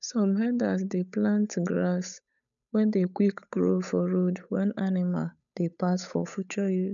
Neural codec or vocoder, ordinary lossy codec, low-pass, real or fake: codec, 16 kHz, 8 kbps, FunCodec, trained on Chinese and English, 25 frames a second; none; 7.2 kHz; fake